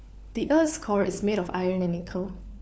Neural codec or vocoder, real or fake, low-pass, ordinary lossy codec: codec, 16 kHz, 4 kbps, FunCodec, trained on LibriTTS, 50 frames a second; fake; none; none